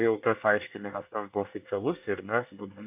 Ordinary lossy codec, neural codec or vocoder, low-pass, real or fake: AAC, 32 kbps; codec, 24 kHz, 1 kbps, SNAC; 3.6 kHz; fake